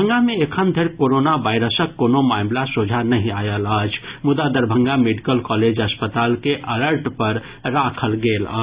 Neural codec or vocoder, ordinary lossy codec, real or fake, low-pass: none; Opus, 64 kbps; real; 3.6 kHz